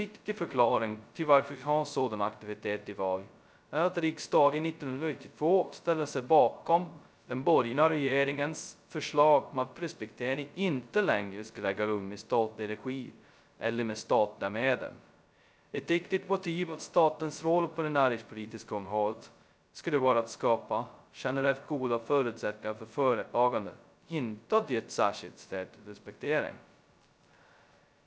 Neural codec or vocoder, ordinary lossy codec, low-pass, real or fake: codec, 16 kHz, 0.2 kbps, FocalCodec; none; none; fake